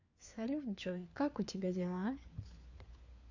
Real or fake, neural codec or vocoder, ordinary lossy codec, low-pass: fake; codec, 16 kHz, 4 kbps, FunCodec, trained on LibriTTS, 50 frames a second; AAC, 48 kbps; 7.2 kHz